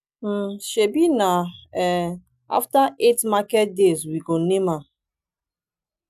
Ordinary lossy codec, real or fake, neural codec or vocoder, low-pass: none; real; none; 14.4 kHz